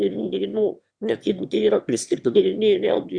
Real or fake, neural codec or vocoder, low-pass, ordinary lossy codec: fake; autoencoder, 22.05 kHz, a latent of 192 numbers a frame, VITS, trained on one speaker; 9.9 kHz; Opus, 64 kbps